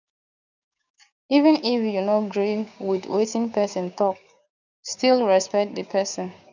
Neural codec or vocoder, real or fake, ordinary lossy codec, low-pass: codec, 16 kHz, 6 kbps, DAC; fake; none; 7.2 kHz